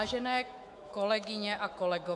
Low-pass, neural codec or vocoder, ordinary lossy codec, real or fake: 10.8 kHz; autoencoder, 48 kHz, 128 numbers a frame, DAC-VAE, trained on Japanese speech; MP3, 64 kbps; fake